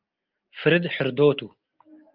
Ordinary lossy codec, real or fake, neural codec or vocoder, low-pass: Opus, 32 kbps; real; none; 5.4 kHz